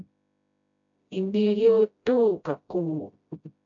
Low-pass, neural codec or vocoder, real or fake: 7.2 kHz; codec, 16 kHz, 0.5 kbps, FreqCodec, smaller model; fake